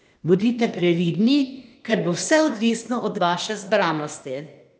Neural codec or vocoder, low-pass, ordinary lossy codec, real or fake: codec, 16 kHz, 0.8 kbps, ZipCodec; none; none; fake